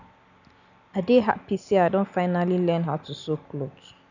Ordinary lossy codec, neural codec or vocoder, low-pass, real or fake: none; none; 7.2 kHz; real